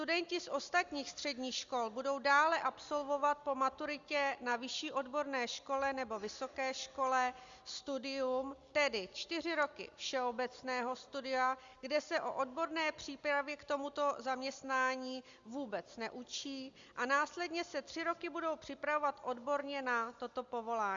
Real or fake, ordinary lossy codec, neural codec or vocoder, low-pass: real; Opus, 64 kbps; none; 7.2 kHz